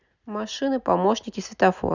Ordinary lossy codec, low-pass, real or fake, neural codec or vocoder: none; 7.2 kHz; real; none